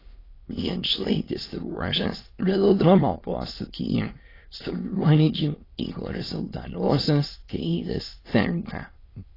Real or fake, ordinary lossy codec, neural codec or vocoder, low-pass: fake; AAC, 24 kbps; autoencoder, 22.05 kHz, a latent of 192 numbers a frame, VITS, trained on many speakers; 5.4 kHz